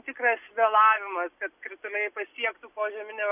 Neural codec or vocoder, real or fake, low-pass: none; real; 3.6 kHz